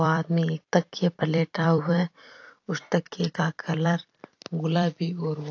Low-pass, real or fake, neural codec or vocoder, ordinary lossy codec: 7.2 kHz; fake; vocoder, 44.1 kHz, 128 mel bands every 256 samples, BigVGAN v2; AAC, 48 kbps